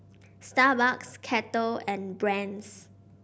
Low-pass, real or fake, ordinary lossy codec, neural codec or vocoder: none; real; none; none